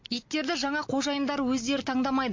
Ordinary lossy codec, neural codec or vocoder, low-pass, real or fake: MP3, 48 kbps; none; 7.2 kHz; real